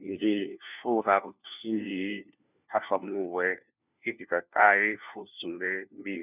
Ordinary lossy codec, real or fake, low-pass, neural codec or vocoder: none; fake; 3.6 kHz; codec, 16 kHz, 1 kbps, FunCodec, trained on LibriTTS, 50 frames a second